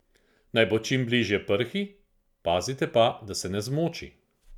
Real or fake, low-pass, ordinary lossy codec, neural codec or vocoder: real; 19.8 kHz; none; none